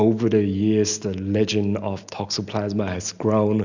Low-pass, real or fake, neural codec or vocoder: 7.2 kHz; real; none